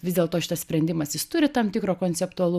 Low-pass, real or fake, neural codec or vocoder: 14.4 kHz; real; none